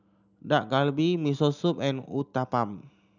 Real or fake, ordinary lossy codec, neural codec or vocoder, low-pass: real; none; none; 7.2 kHz